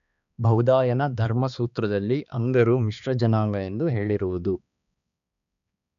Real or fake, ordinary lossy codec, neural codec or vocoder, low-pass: fake; none; codec, 16 kHz, 2 kbps, X-Codec, HuBERT features, trained on balanced general audio; 7.2 kHz